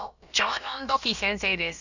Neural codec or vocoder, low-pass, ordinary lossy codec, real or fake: codec, 16 kHz, about 1 kbps, DyCAST, with the encoder's durations; 7.2 kHz; none; fake